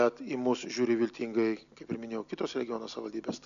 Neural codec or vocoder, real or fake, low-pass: none; real; 7.2 kHz